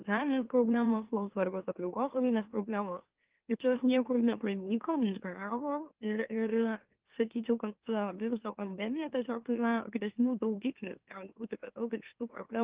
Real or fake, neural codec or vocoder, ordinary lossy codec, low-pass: fake; autoencoder, 44.1 kHz, a latent of 192 numbers a frame, MeloTTS; Opus, 32 kbps; 3.6 kHz